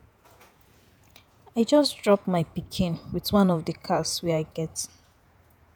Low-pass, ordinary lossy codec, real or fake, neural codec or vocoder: none; none; fake; vocoder, 48 kHz, 128 mel bands, Vocos